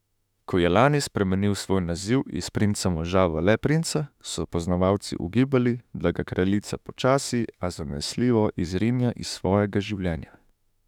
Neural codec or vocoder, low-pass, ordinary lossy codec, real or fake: autoencoder, 48 kHz, 32 numbers a frame, DAC-VAE, trained on Japanese speech; 19.8 kHz; none; fake